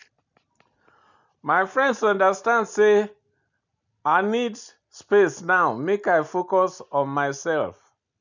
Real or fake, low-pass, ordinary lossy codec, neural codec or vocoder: real; 7.2 kHz; none; none